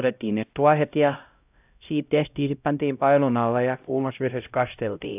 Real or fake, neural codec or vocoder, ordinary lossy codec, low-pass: fake; codec, 16 kHz, 0.5 kbps, X-Codec, HuBERT features, trained on LibriSpeech; AAC, 32 kbps; 3.6 kHz